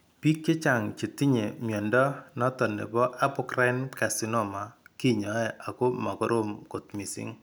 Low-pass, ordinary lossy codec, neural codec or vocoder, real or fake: none; none; none; real